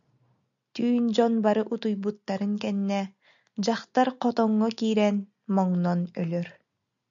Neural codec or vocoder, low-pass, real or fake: none; 7.2 kHz; real